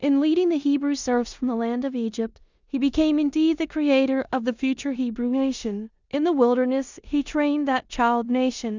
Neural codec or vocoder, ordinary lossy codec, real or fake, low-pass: codec, 16 kHz in and 24 kHz out, 0.9 kbps, LongCat-Audio-Codec, four codebook decoder; Opus, 64 kbps; fake; 7.2 kHz